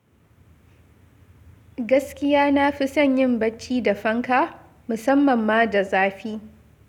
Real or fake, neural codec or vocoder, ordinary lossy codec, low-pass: real; none; none; 19.8 kHz